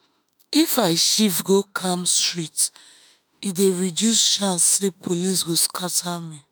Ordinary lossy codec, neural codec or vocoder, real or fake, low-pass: none; autoencoder, 48 kHz, 32 numbers a frame, DAC-VAE, trained on Japanese speech; fake; none